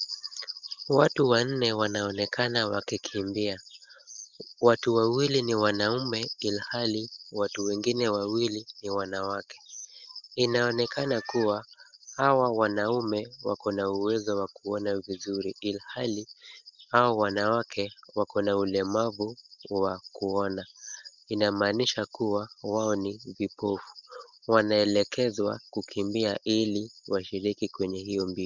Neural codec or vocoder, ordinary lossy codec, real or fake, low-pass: none; Opus, 32 kbps; real; 7.2 kHz